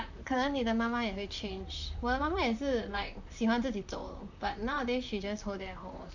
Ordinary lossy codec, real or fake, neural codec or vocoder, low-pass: none; fake; vocoder, 44.1 kHz, 128 mel bands, Pupu-Vocoder; 7.2 kHz